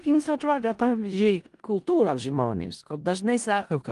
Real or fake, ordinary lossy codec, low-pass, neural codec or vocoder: fake; Opus, 24 kbps; 10.8 kHz; codec, 16 kHz in and 24 kHz out, 0.4 kbps, LongCat-Audio-Codec, four codebook decoder